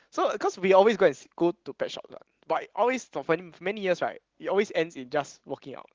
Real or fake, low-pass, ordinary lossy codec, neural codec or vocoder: real; 7.2 kHz; Opus, 16 kbps; none